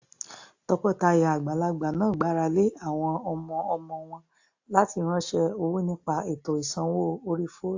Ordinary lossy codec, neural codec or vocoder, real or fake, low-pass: AAC, 48 kbps; none; real; 7.2 kHz